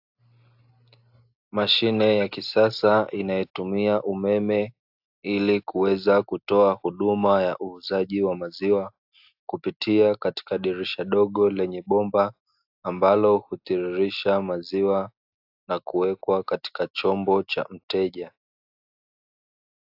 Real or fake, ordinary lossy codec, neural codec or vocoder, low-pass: real; AAC, 48 kbps; none; 5.4 kHz